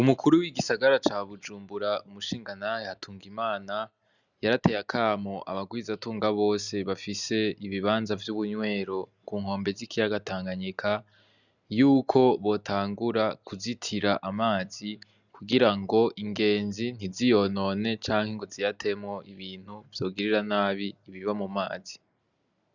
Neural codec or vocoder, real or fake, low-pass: none; real; 7.2 kHz